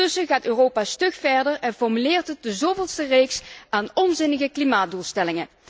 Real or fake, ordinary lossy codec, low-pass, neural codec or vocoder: real; none; none; none